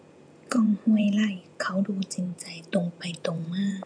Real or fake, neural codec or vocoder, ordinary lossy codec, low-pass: fake; vocoder, 48 kHz, 128 mel bands, Vocos; none; 9.9 kHz